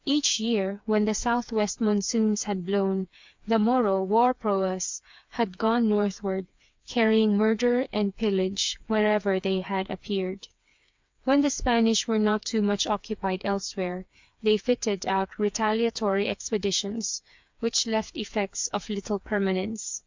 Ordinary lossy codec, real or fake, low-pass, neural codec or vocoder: MP3, 64 kbps; fake; 7.2 kHz; codec, 16 kHz, 4 kbps, FreqCodec, smaller model